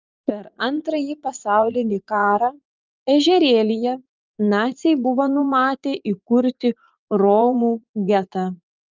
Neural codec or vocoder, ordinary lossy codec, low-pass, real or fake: vocoder, 44.1 kHz, 80 mel bands, Vocos; Opus, 24 kbps; 7.2 kHz; fake